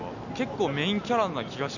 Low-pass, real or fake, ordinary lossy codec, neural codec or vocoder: 7.2 kHz; real; none; none